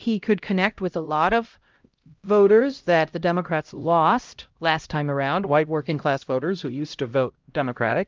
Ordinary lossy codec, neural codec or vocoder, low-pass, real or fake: Opus, 32 kbps; codec, 16 kHz, 0.5 kbps, X-Codec, HuBERT features, trained on LibriSpeech; 7.2 kHz; fake